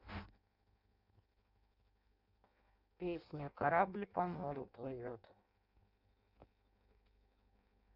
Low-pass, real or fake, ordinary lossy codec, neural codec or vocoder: 5.4 kHz; fake; none; codec, 16 kHz in and 24 kHz out, 0.6 kbps, FireRedTTS-2 codec